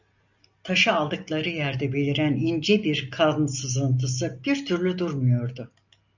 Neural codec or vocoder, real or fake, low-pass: none; real; 7.2 kHz